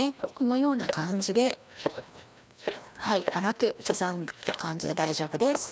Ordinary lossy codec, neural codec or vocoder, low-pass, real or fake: none; codec, 16 kHz, 1 kbps, FreqCodec, larger model; none; fake